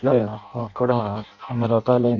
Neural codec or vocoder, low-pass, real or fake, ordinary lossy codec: codec, 16 kHz in and 24 kHz out, 0.6 kbps, FireRedTTS-2 codec; 7.2 kHz; fake; MP3, 48 kbps